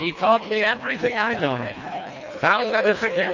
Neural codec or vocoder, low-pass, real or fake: codec, 24 kHz, 1.5 kbps, HILCodec; 7.2 kHz; fake